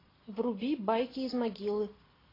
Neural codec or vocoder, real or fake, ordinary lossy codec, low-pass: none; real; AAC, 24 kbps; 5.4 kHz